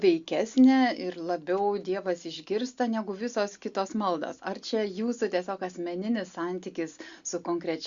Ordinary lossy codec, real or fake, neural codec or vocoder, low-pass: Opus, 64 kbps; real; none; 7.2 kHz